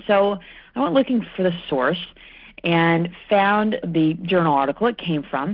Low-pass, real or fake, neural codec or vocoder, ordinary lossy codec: 5.4 kHz; real; none; Opus, 16 kbps